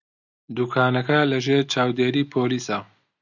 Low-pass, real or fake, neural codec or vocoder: 7.2 kHz; real; none